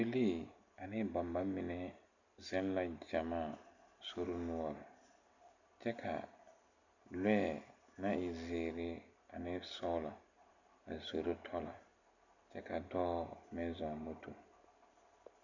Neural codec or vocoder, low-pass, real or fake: none; 7.2 kHz; real